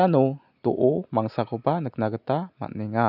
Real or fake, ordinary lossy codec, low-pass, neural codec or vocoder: real; none; 5.4 kHz; none